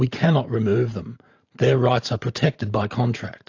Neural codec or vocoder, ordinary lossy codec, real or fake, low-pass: vocoder, 44.1 kHz, 128 mel bands every 512 samples, BigVGAN v2; AAC, 48 kbps; fake; 7.2 kHz